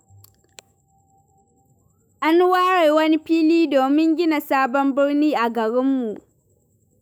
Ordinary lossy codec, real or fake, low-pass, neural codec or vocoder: none; fake; none; autoencoder, 48 kHz, 128 numbers a frame, DAC-VAE, trained on Japanese speech